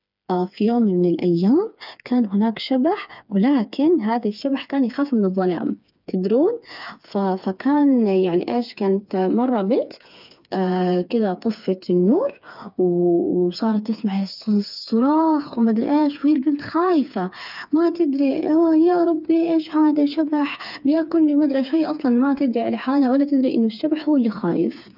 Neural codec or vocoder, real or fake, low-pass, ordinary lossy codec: codec, 16 kHz, 4 kbps, FreqCodec, smaller model; fake; 5.4 kHz; none